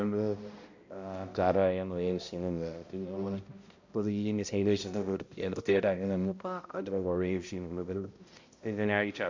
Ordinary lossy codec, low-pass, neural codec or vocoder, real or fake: MP3, 48 kbps; 7.2 kHz; codec, 16 kHz, 0.5 kbps, X-Codec, HuBERT features, trained on balanced general audio; fake